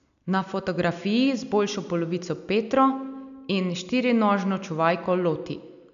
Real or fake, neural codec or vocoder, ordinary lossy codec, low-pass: real; none; none; 7.2 kHz